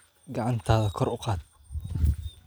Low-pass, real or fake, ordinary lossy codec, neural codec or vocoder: none; real; none; none